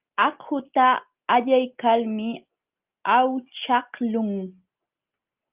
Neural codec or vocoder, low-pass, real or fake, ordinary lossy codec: none; 3.6 kHz; real; Opus, 32 kbps